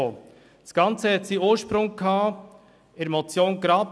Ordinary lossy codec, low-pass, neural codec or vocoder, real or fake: none; none; none; real